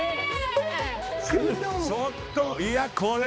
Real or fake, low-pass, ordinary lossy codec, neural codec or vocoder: fake; none; none; codec, 16 kHz, 2 kbps, X-Codec, HuBERT features, trained on balanced general audio